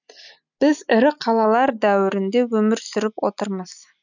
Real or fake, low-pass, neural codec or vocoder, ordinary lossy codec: real; 7.2 kHz; none; none